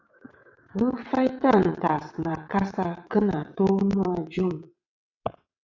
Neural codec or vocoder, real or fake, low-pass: vocoder, 22.05 kHz, 80 mel bands, WaveNeXt; fake; 7.2 kHz